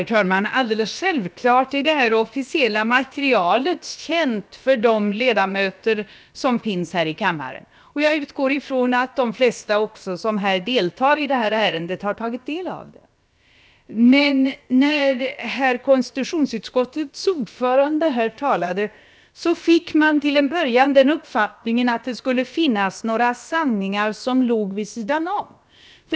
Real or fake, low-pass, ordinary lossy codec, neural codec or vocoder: fake; none; none; codec, 16 kHz, about 1 kbps, DyCAST, with the encoder's durations